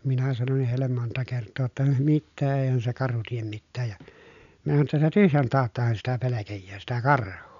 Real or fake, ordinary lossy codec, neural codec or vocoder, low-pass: real; none; none; 7.2 kHz